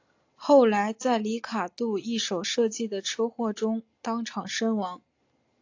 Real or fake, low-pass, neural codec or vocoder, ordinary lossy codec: real; 7.2 kHz; none; AAC, 48 kbps